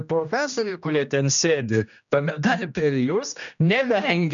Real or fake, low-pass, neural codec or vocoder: fake; 7.2 kHz; codec, 16 kHz, 1 kbps, X-Codec, HuBERT features, trained on general audio